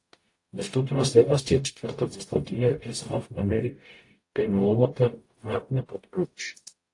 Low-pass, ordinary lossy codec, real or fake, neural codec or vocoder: 10.8 kHz; AAC, 48 kbps; fake; codec, 44.1 kHz, 0.9 kbps, DAC